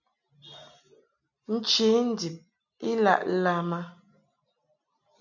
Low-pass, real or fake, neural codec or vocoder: 7.2 kHz; real; none